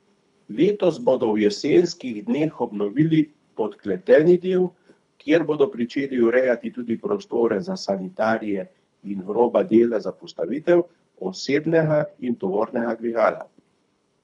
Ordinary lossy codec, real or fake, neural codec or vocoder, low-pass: none; fake; codec, 24 kHz, 3 kbps, HILCodec; 10.8 kHz